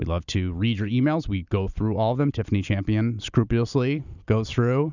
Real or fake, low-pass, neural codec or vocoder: real; 7.2 kHz; none